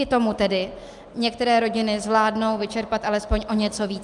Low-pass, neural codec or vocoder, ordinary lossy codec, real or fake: 10.8 kHz; none; Opus, 32 kbps; real